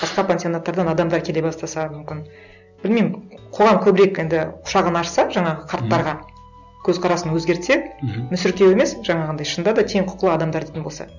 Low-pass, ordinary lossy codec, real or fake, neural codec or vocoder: 7.2 kHz; none; real; none